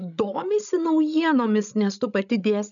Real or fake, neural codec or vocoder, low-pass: fake; codec, 16 kHz, 16 kbps, FreqCodec, larger model; 7.2 kHz